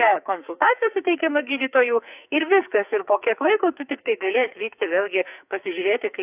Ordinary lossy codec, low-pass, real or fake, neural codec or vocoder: AAC, 32 kbps; 3.6 kHz; fake; codec, 44.1 kHz, 2.6 kbps, SNAC